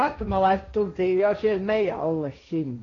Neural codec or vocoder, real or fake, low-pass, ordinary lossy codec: codec, 16 kHz, 1.1 kbps, Voila-Tokenizer; fake; 7.2 kHz; AAC, 32 kbps